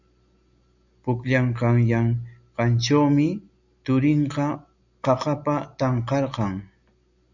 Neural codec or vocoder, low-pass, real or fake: none; 7.2 kHz; real